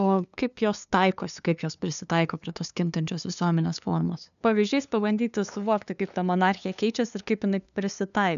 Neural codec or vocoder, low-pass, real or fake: codec, 16 kHz, 2 kbps, FunCodec, trained on LibriTTS, 25 frames a second; 7.2 kHz; fake